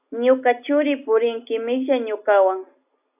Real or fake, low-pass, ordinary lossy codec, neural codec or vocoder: real; 3.6 kHz; AAC, 32 kbps; none